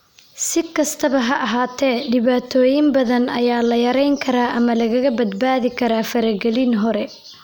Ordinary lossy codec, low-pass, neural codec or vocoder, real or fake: none; none; none; real